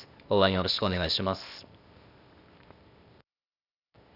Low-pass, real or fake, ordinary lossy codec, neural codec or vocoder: 5.4 kHz; fake; none; codec, 16 kHz, 0.8 kbps, ZipCodec